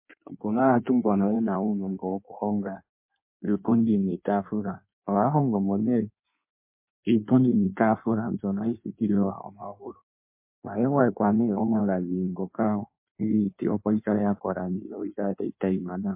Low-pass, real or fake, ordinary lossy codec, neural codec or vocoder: 3.6 kHz; fake; MP3, 24 kbps; codec, 16 kHz in and 24 kHz out, 1.1 kbps, FireRedTTS-2 codec